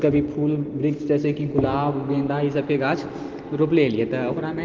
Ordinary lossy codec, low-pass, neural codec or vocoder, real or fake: Opus, 16 kbps; 7.2 kHz; none; real